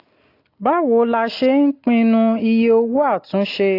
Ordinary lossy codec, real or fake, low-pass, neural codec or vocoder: AAC, 32 kbps; real; 5.4 kHz; none